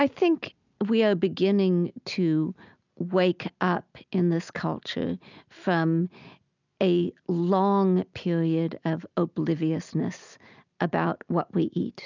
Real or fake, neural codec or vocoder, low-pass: real; none; 7.2 kHz